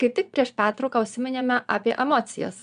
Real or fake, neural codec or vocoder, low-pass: fake; vocoder, 22.05 kHz, 80 mel bands, Vocos; 9.9 kHz